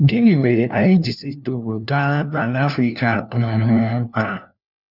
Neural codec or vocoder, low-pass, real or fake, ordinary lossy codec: codec, 16 kHz, 1 kbps, FunCodec, trained on LibriTTS, 50 frames a second; 5.4 kHz; fake; none